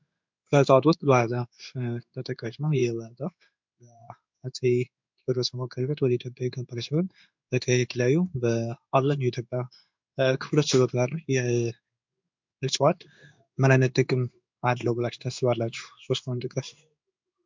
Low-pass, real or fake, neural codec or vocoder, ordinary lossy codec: 7.2 kHz; fake; codec, 16 kHz in and 24 kHz out, 1 kbps, XY-Tokenizer; MP3, 64 kbps